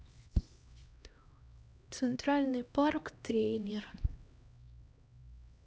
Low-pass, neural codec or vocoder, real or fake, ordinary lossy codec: none; codec, 16 kHz, 1 kbps, X-Codec, HuBERT features, trained on LibriSpeech; fake; none